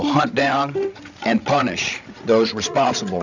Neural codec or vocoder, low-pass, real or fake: codec, 16 kHz, 16 kbps, FreqCodec, larger model; 7.2 kHz; fake